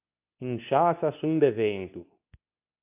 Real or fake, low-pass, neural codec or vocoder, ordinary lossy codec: fake; 3.6 kHz; codec, 24 kHz, 0.9 kbps, WavTokenizer, medium speech release version 2; AAC, 32 kbps